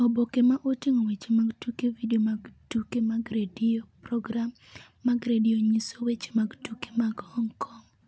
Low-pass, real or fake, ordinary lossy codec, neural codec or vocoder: none; real; none; none